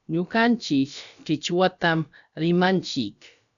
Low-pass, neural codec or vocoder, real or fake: 7.2 kHz; codec, 16 kHz, about 1 kbps, DyCAST, with the encoder's durations; fake